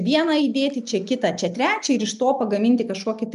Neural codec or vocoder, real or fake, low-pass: none; real; 10.8 kHz